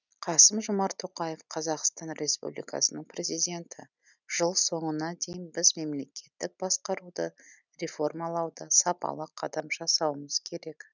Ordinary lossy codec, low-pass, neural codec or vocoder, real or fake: none; 7.2 kHz; none; real